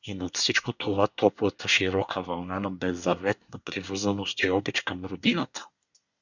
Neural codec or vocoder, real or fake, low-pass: codec, 24 kHz, 1 kbps, SNAC; fake; 7.2 kHz